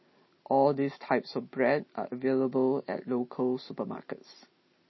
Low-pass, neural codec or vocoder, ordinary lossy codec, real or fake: 7.2 kHz; none; MP3, 24 kbps; real